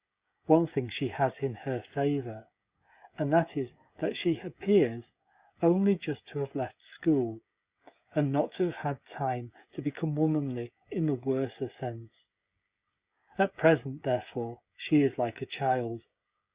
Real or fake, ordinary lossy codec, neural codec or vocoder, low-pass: fake; Opus, 64 kbps; codec, 16 kHz, 16 kbps, FreqCodec, smaller model; 3.6 kHz